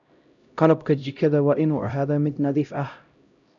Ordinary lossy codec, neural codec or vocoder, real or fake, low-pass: none; codec, 16 kHz, 0.5 kbps, X-Codec, HuBERT features, trained on LibriSpeech; fake; 7.2 kHz